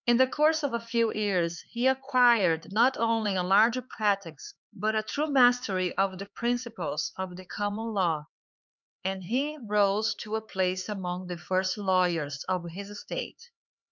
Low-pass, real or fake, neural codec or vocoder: 7.2 kHz; fake; codec, 16 kHz, 4 kbps, X-Codec, HuBERT features, trained on LibriSpeech